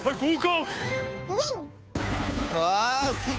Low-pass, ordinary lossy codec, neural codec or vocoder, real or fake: none; none; codec, 16 kHz, 2 kbps, FunCodec, trained on Chinese and English, 25 frames a second; fake